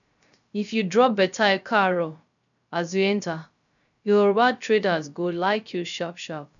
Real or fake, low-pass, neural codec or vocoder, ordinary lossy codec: fake; 7.2 kHz; codec, 16 kHz, 0.3 kbps, FocalCodec; none